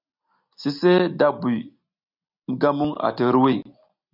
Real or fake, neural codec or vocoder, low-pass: real; none; 5.4 kHz